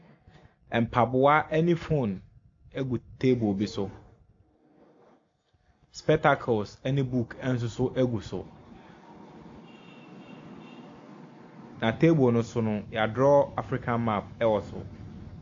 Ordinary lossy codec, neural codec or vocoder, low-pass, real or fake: AAC, 48 kbps; none; 7.2 kHz; real